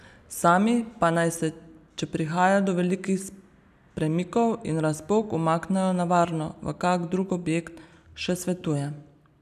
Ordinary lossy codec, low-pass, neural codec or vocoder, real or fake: none; 14.4 kHz; none; real